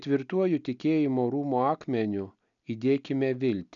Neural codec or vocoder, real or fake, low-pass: none; real; 7.2 kHz